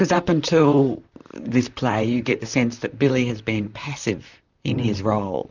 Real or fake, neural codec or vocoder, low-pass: fake; vocoder, 44.1 kHz, 128 mel bands, Pupu-Vocoder; 7.2 kHz